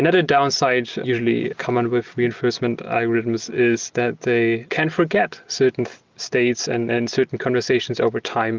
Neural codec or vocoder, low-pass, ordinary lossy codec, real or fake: none; 7.2 kHz; Opus, 32 kbps; real